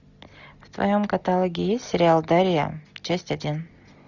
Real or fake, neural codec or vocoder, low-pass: real; none; 7.2 kHz